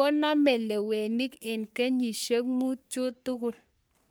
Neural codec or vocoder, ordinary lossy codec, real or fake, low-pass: codec, 44.1 kHz, 3.4 kbps, Pupu-Codec; none; fake; none